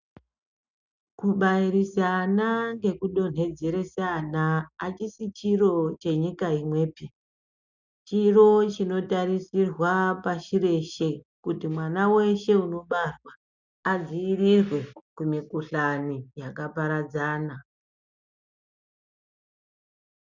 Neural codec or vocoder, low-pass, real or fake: none; 7.2 kHz; real